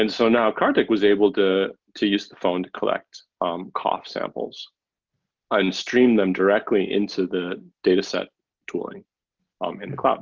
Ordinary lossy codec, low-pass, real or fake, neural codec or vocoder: Opus, 32 kbps; 7.2 kHz; real; none